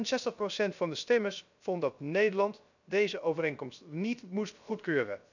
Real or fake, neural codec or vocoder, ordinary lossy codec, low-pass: fake; codec, 16 kHz, 0.3 kbps, FocalCodec; none; 7.2 kHz